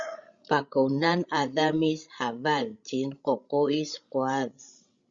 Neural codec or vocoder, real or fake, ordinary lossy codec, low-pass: codec, 16 kHz, 16 kbps, FreqCodec, larger model; fake; Opus, 64 kbps; 7.2 kHz